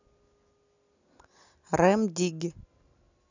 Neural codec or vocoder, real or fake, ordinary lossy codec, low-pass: none; real; none; 7.2 kHz